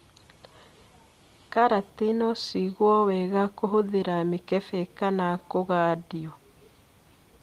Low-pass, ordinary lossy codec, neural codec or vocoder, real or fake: 14.4 kHz; Opus, 24 kbps; none; real